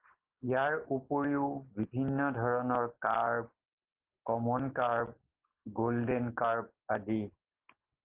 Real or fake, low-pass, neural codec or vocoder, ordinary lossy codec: real; 3.6 kHz; none; Opus, 16 kbps